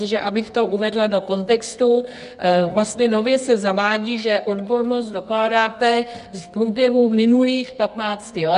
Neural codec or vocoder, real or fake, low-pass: codec, 24 kHz, 0.9 kbps, WavTokenizer, medium music audio release; fake; 10.8 kHz